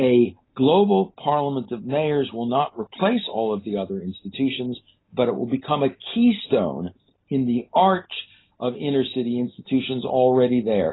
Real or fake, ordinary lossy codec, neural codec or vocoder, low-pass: real; AAC, 16 kbps; none; 7.2 kHz